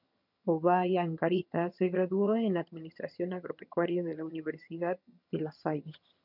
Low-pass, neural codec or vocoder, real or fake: 5.4 kHz; vocoder, 22.05 kHz, 80 mel bands, HiFi-GAN; fake